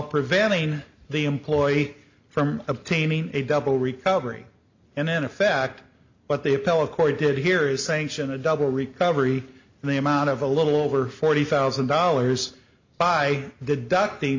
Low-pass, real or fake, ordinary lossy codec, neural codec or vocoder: 7.2 kHz; real; MP3, 48 kbps; none